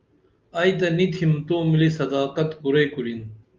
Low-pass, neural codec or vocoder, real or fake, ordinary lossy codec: 7.2 kHz; none; real; Opus, 32 kbps